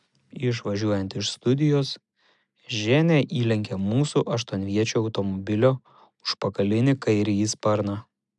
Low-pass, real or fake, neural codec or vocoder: 10.8 kHz; real; none